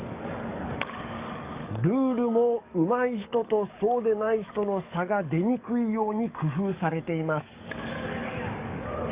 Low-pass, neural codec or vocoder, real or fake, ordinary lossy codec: 3.6 kHz; codec, 44.1 kHz, 7.8 kbps, DAC; fake; Opus, 64 kbps